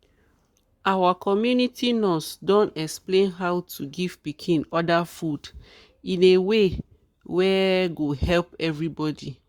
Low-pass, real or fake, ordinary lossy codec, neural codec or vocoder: 19.8 kHz; fake; Opus, 64 kbps; codec, 44.1 kHz, 7.8 kbps, Pupu-Codec